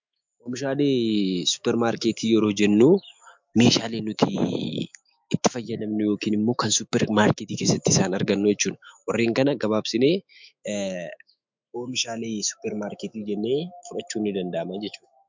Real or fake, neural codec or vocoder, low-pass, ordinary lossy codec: fake; autoencoder, 48 kHz, 128 numbers a frame, DAC-VAE, trained on Japanese speech; 7.2 kHz; MP3, 64 kbps